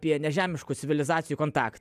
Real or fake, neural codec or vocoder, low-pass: real; none; 14.4 kHz